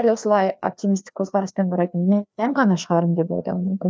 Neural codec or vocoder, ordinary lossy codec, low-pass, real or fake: codec, 16 kHz, 2 kbps, FreqCodec, larger model; none; none; fake